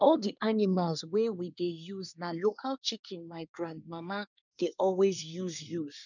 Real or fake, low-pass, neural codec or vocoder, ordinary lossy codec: fake; 7.2 kHz; codec, 24 kHz, 1 kbps, SNAC; none